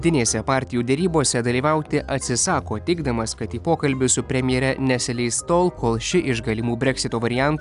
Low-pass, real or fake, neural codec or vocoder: 10.8 kHz; real; none